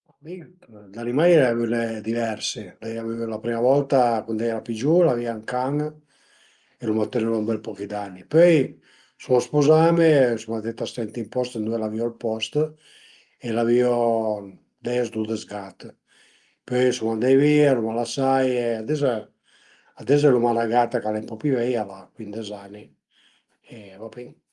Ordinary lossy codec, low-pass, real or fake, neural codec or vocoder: Opus, 32 kbps; 10.8 kHz; real; none